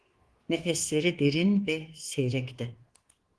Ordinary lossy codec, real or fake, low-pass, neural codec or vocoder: Opus, 16 kbps; fake; 10.8 kHz; codec, 24 kHz, 1.2 kbps, DualCodec